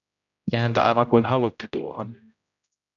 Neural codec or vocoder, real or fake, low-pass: codec, 16 kHz, 0.5 kbps, X-Codec, HuBERT features, trained on general audio; fake; 7.2 kHz